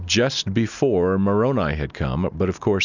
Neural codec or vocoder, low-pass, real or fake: none; 7.2 kHz; real